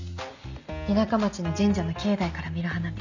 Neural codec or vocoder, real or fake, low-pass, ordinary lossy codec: none; real; 7.2 kHz; none